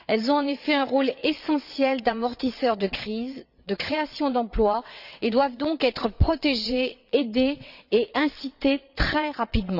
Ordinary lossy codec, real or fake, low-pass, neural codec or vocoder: none; fake; 5.4 kHz; vocoder, 22.05 kHz, 80 mel bands, WaveNeXt